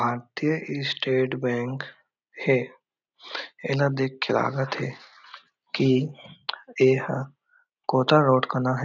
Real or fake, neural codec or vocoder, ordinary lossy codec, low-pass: real; none; none; 7.2 kHz